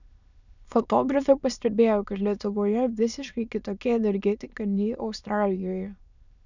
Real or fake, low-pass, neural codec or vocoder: fake; 7.2 kHz; autoencoder, 22.05 kHz, a latent of 192 numbers a frame, VITS, trained on many speakers